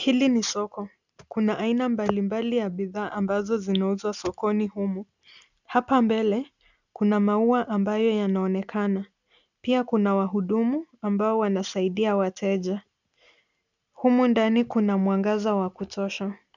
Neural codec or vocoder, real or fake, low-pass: none; real; 7.2 kHz